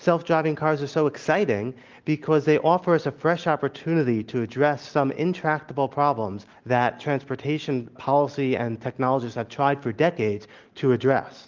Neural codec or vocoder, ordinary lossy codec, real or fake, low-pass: none; Opus, 32 kbps; real; 7.2 kHz